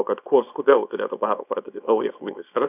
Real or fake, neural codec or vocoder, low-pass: fake; codec, 24 kHz, 0.9 kbps, WavTokenizer, small release; 3.6 kHz